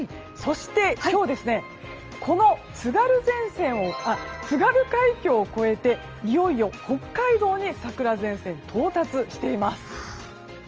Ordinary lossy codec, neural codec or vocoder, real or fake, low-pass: Opus, 24 kbps; none; real; 7.2 kHz